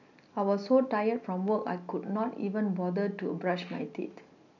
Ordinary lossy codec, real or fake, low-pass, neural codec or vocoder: none; real; 7.2 kHz; none